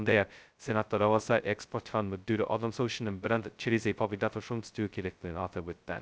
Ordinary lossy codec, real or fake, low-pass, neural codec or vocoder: none; fake; none; codec, 16 kHz, 0.2 kbps, FocalCodec